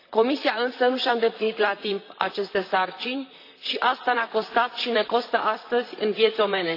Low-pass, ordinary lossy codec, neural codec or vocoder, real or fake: 5.4 kHz; AAC, 32 kbps; vocoder, 44.1 kHz, 128 mel bands, Pupu-Vocoder; fake